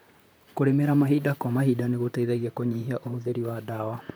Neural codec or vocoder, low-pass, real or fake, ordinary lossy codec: vocoder, 44.1 kHz, 128 mel bands, Pupu-Vocoder; none; fake; none